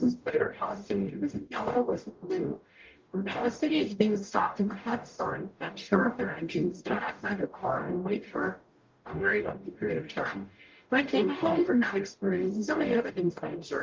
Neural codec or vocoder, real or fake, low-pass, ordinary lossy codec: codec, 44.1 kHz, 0.9 kbps, DAC; fake; 7.2 kHz; Opus, 32 kbps